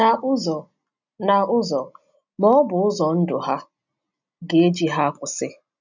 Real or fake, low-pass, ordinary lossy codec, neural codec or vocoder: real; 7.2 kHz; none; none